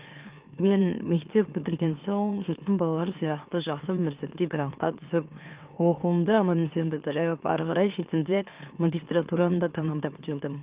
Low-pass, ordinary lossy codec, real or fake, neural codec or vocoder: 3.6 kHz; Opus, 24 kbps; fake; autoencoder, 44.1 kHz, a latent of 192 numbers a frame, MeloTTS